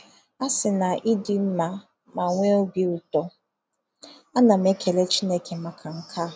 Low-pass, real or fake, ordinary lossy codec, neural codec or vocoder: none; real; none; none